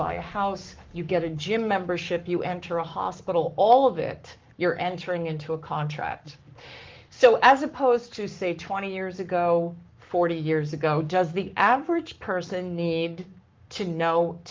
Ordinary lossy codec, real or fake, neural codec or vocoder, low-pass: Opus, 24 kbps; fake; codec, 44.1 kHz, 7.8 kbps, Pupu-Codec; 7.2 kHz